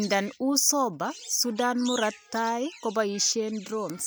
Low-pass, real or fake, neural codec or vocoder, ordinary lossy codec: none; real; none; none